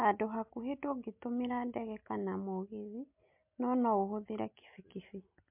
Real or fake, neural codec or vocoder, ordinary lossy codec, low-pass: real; none; MP3, 32 kbps; 3.6 kHz